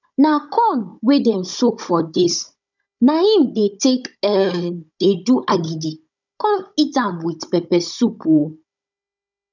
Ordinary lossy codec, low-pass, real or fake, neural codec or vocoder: none; 7.2 kHz; fake; codec, 16 kHz, 16 kbps, FunCodec, trained on Chinese and English, 50 frames a second